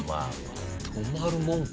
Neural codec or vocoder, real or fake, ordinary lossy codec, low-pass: none; real; none; none